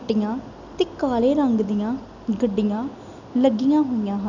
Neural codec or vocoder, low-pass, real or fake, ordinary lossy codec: none; 7.2 kHz; real; none